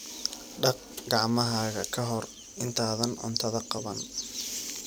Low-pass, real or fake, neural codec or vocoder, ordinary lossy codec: none; fake; vocoder, 44.1 kHz, 128 mel bands every 256 samples, BigVGAN v2; none